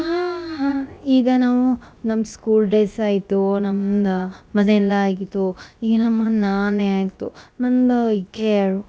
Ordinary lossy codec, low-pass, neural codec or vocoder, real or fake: none; none; codec, 16 kHz, about 1 kbps, DyCAST, with the encoder's durations; fake